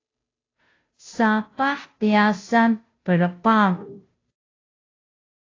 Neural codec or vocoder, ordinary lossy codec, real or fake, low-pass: codec, 16 kHz, 0.5 kbps, FunCodec, trained on Chinese and English, 25 frames a second; AAC, 32 kbps; fake; 7.2 kHz